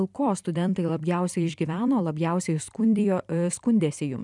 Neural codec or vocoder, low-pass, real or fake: vocoder, 44.1 kHz, 128 mel bands every 256 samples, BigVGAN v2; 10.8 kHz; fake